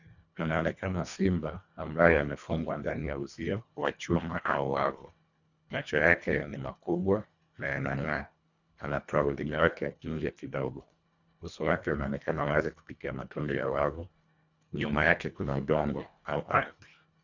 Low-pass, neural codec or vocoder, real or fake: 7.2 kHz; codec, 24 kHz, 1.5 kbps, HILCodec; fake